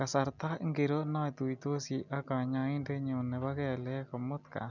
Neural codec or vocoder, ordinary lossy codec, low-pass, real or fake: none; none; 7.2 kHz; real